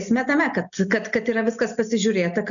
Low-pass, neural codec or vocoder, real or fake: 7.2 kHz; none; real